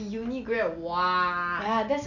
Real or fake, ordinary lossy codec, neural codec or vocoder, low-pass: real; none; none; 7.2 kHz